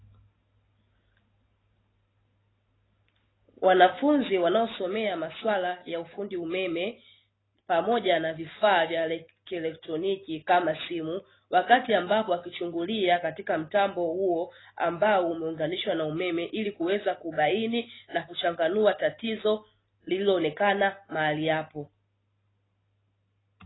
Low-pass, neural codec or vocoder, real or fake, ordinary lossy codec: 7.2 kHz; none; real; AAC, 16 kbps